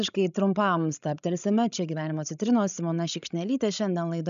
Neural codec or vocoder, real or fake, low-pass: codec, 16 kHz, 16 kbps, FreqCodec, larger model; fake; 7.2 kHz